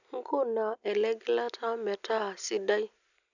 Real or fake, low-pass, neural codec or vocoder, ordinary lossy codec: real; 7.2 kHz; none; none